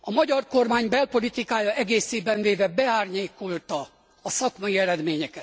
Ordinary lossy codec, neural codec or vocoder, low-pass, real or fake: none; none; none; real